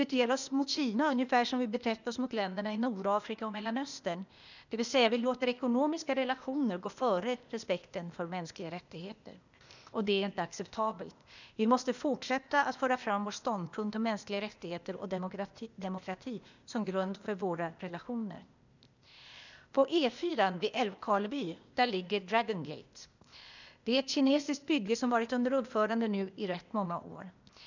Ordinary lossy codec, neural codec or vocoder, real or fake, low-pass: none; codec, 16 kHz, 0.8 kbps, ZipCodec; fake; 7.2 kHz